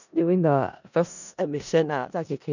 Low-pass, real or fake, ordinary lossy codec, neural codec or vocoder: 7.2 kHz; fake; none; codec, 16 kHz in and 24 kHz out, 0.4 kbps, LongCat-Audio-Codec, four codebook decoder